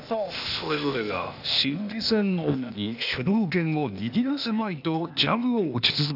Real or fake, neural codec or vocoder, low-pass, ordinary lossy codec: fake; codec, 16 kHz, 0.8 kbps, ZipCodec; 5.4 kHz; none